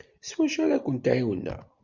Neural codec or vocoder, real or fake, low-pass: none; real; 7.2 kHz